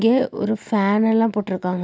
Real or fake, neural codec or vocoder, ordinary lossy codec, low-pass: real; none; none; none